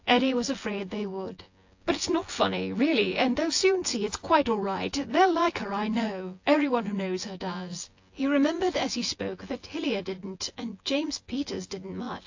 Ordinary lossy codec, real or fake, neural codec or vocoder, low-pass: AAC, 48 kbps; fake; vocoder, 24 kHz, 100 mel bands, Vocos; 7.2 kHz